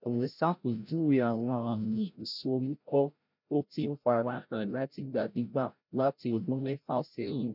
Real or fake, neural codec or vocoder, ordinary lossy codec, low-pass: fake; codec, 16 kHz, 0.5 kbps, FreqCodec, larger model; none; 5.4 kHz